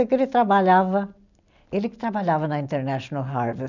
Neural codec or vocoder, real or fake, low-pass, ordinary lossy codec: none; real; 7.2 kHz; none